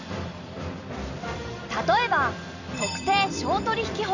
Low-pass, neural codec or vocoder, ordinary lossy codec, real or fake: 7.2 kHz; vocoder, 44.1 kHz, 128 mel bands every 256 samples, BigVGAN v2; none; fake